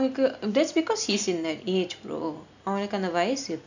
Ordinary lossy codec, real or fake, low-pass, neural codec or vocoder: none; real; 7.2 kHz; none